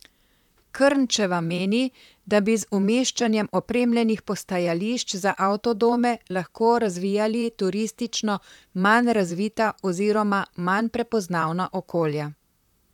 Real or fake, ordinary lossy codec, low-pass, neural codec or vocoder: fake; none; 19.8 kHz; vocoder, 44.1 kHz, 128 mel bands, Pupu-Vocoder